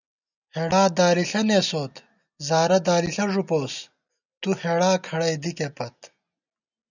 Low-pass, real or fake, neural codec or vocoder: 7.2 kHz; real; none